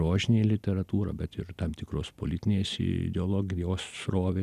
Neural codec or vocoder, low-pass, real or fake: none; 14.4 kHz; real